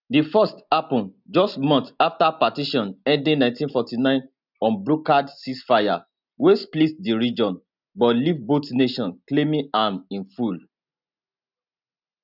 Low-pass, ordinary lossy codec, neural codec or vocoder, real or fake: 5.4 kHz; none; none; real